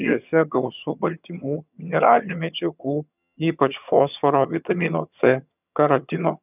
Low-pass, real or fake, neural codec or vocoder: 3.6 kHz; fake; vocoder, 22.05 kHz, 80 mel bands, HiFi-GAN